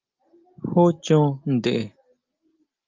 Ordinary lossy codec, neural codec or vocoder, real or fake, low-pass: Opus, 24 kbps; none; real; 7.2 kHz